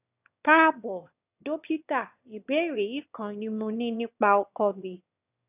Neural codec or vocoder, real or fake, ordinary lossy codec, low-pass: autoencoder, 22.05 kHz, a latent of 192 numbers a frame, VITS, trained on one speaker; fake; none; 3.6 kHz